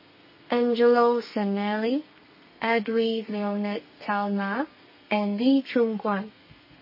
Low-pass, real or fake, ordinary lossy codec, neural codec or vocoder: 5.4 kHz; fake; MP3, 24 kbps; codec, 32 kHz, 1.9 kbps, SNAC